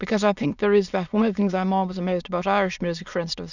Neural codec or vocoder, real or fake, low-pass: autoencoder, 22.05 kHz, a latent of 192 numbers a frame, VITS, trained on many speakers; fake; 7.2 kHz